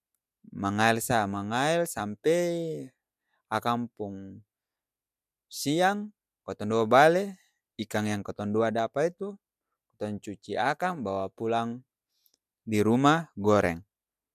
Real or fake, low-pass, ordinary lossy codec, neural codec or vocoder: real; 14.4 kHz; none; none